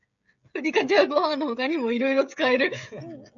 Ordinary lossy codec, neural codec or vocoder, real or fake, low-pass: MP3, 48 kbps; codec, 16 kHz, 16 kbps, FreqCodec, smaller model; fake; 7.2 kHz